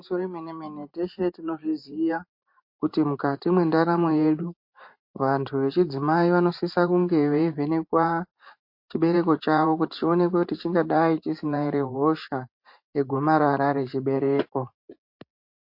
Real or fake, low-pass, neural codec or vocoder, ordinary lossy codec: fake; 5.4 kHz; vocoder, 44.1 kHz, 128 mel bands every 512 samples, BigVGAN v2; MP3, 32 kbps